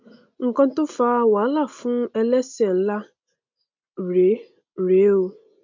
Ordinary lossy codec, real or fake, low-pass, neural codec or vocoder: MP3, 64 kbps; real; 7.2 kHz; none